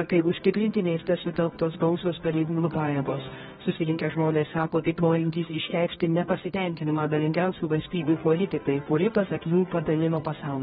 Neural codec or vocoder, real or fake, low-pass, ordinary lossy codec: codec, 24 kHz, 0.9 kbps, WavTokenizer, medium music audio release; fake; 10.8 kHz; AAC, 16 kbps